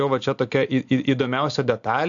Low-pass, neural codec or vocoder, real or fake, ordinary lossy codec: 7.2 kHz; none; real; AAC, 64 kbps